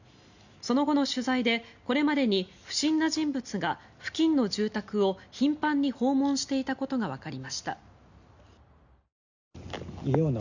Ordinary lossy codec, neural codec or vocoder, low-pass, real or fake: none; none; 7.2 kHz; real